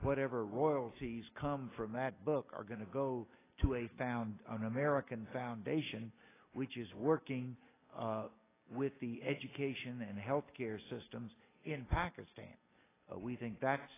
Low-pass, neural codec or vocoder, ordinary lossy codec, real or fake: 3.6 kHz; none; AAC, 16 kbps; real